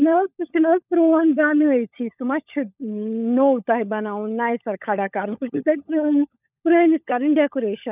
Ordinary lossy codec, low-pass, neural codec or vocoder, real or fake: none; 3.6 kHz; codec, 16 kHz, 16 kbps, FunCodec, trained on LibriTTS, 50 frames a second; fake